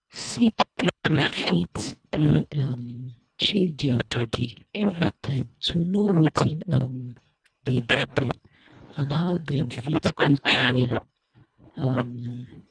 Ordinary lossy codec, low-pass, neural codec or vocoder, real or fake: none; 9.9 kHz; codec, 24 kHz, 1.5 kbps, HILCodec; fake